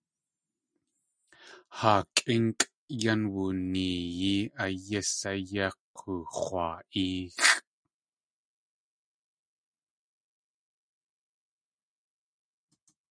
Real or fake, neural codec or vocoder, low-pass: real; none; 9.9 kHz